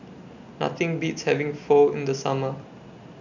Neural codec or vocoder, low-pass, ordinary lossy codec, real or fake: none; 7.2 kHz; none; real